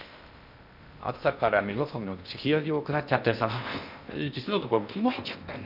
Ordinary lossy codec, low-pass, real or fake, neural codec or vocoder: none; 5.4 kHz; fake; codec, 16 kHz in and 24 kHz out, 0.6 kbps, FocalCodec, streaming, 2048 codes